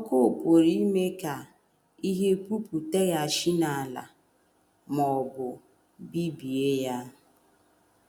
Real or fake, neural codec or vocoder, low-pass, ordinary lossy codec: real; none; 19.8 kHz; none